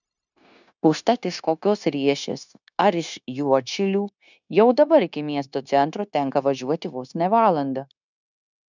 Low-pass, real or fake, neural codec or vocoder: 7.2 kHz; fake; codec, 16 kHz, 0.9 kbps, LongCat-Audio-Codec